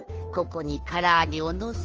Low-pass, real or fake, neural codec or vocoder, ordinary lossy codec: 7.2 kHz; fake; codec, 16 kHz, 2 kbps, FunCodec, trained on Chinese and English, 25 frames a second; Opus, 24 kbps